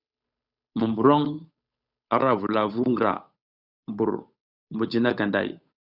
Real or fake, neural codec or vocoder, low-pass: fake; codec, 16 kHz, 8 kbps, FunCodec, trained on Chinese and English, 25 frames a second; 5.4 kHz